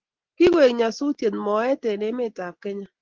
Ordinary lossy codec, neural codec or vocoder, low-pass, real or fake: Opus, 16 kbps; none; 7.2 kHz; real